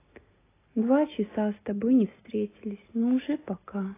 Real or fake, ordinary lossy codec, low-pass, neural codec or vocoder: real; AAC, 16 kbps; 3.6 kHz; none